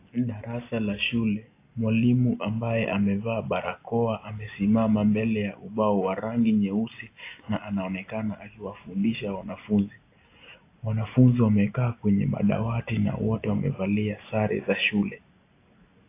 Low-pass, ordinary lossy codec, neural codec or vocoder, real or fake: 3.6 kHz; AAC, 24 kbps; none; real